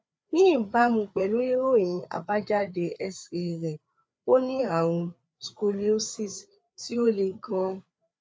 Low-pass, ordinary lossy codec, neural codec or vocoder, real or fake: none; none; codec, 16 kHz, 4 kbps, FreqCodec, larger model; fake